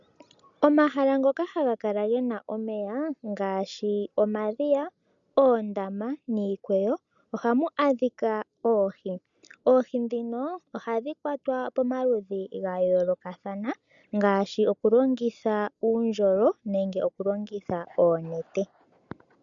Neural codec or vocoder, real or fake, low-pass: none; real; 7.2 kHz